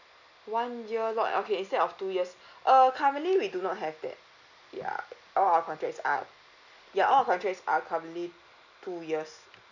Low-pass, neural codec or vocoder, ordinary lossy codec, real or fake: 7.2 kHz; none; none; real